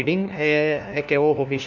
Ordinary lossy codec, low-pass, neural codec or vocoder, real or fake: none; 7.2 kHz; codec, 16 kHz, 1 kbps, FunCodec, trained on LibriTTS, 50 frames a second; fake